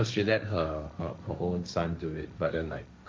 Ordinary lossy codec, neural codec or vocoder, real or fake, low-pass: none; codec, 16 kHz, 1.1 kbps, Voila-Tokenizer; fake; none